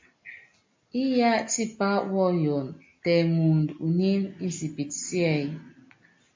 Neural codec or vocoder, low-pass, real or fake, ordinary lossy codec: none; 7.2 kHz; real; MP3, 48 kbps